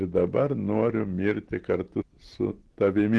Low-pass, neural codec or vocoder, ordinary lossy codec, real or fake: 10.8 kHz; none; Opus, 16 kbps; real